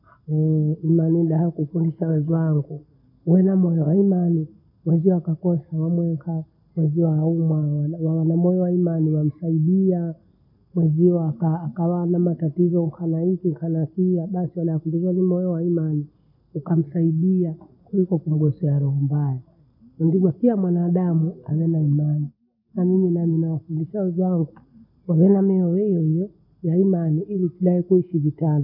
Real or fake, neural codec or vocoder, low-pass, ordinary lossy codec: real; none; 5.4 kHz; none